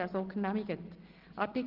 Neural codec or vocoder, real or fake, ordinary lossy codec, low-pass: none; real; Opus, 16 kbps; 5.4 kHz